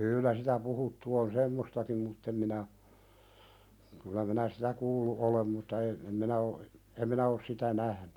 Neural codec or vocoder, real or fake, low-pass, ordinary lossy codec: none; real; 19.8 kHz; none